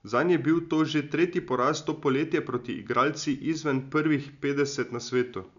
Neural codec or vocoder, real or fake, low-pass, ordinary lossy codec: none; real; 7.2 kHz; none